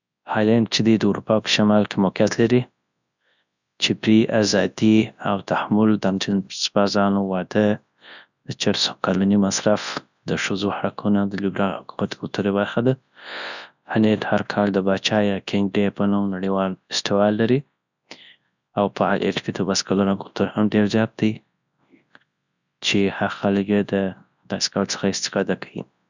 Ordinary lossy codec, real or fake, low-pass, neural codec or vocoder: none; fake; 7.2 kHz; codec, 24 kHz, 0.9 kbps, WavTokenizer, large speech release